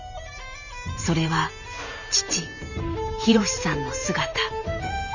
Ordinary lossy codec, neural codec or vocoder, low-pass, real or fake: none; vocoder, 44.1 kHz, 128 mel bands every 512 samples, BigVGAN v2; 7.2 kHz; fake